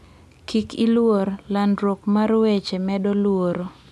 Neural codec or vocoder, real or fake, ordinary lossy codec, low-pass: none; real; none; none